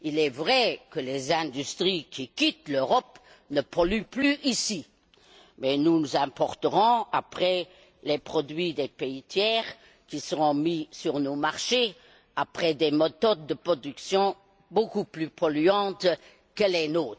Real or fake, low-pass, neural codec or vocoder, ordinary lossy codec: real; none; none; none